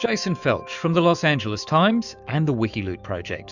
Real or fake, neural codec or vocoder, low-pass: fake; autoencoder, 48 kHz, 128 numbers a frame, DAC-VAE, trained on Japanese speech; 7.2 kHz